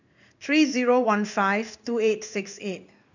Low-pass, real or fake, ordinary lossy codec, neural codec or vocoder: 7.2 kHz; fake; none; codec, 16 kHz in and 24 kHz out, 1 kbps, XY-Tokenizer